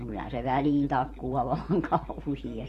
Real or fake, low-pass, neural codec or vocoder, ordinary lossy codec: fake; 14.4 kHz; vocoder, 44.1 kHz, 128 mel bands every 512 samples, BigVGAN v2; AAC, 48 kbps